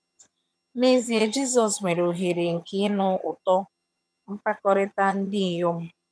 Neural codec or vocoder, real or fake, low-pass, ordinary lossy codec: vocoder, 22.05 kHz, 80 mel bands, HiFi-GAN; fake; none; none